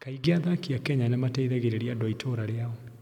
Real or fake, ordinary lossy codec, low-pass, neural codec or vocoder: real; none; 19.8 kHz; none